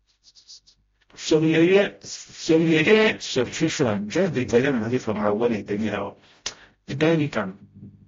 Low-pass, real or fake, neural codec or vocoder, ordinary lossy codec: 7.2 kHz; fake; codec, 16 kHz, 0.5 kbps, FreqCodec, smaller model; AAC, 32 kbps